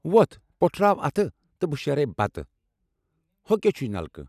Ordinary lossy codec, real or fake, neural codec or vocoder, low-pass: none; real; none; 14.4 kHz